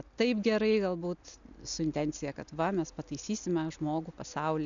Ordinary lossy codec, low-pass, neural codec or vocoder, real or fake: MP3, 96 kbps; 7.2 kHz; none; real